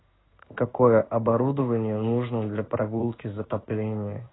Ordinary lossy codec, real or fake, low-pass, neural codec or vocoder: AAC, 16 kbps; fake; 7.2 kHz; codec, 16 kHz in and 24 kHz out, 1 kbps, XY-Tokenizer